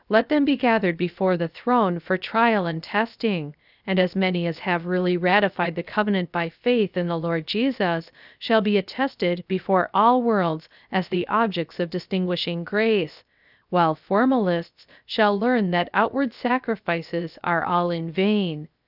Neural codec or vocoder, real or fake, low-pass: codec, 16 kHz, 0.7 kbps, FocalCodec; fake; 5.4 kHz